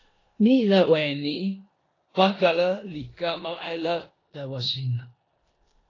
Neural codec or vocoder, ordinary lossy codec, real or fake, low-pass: codec, 16 kHz in and 24 kHz out, 0.9 kbps, LongCat-Audio-Codec, four codebook decoder; AAC, 32 kbps; fake; 7.2 kHz